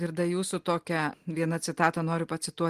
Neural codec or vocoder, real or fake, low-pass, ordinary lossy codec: none; real; 14.4 kHz; Opus, 24 kbps